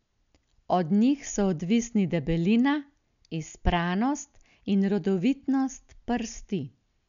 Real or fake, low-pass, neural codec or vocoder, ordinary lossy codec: real; 7.2 kHz; none; none